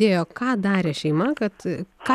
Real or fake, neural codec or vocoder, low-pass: real; none; 14.4 kHz